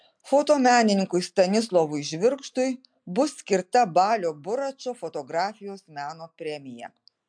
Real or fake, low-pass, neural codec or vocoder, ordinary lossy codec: real; 9.9 kHz; none; MP3, 64 kbps